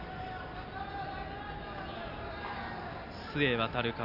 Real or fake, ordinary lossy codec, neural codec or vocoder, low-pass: real; none; none; 5.4 kHz